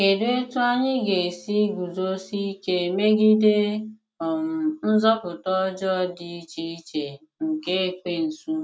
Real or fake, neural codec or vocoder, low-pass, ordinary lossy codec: real; none; none; none